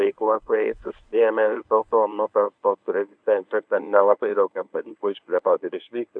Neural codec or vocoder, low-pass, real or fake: codec, 24 kHz, 0.9 kbps, WavTokenizer, medium speech release version 1; 9.9 kHz; fake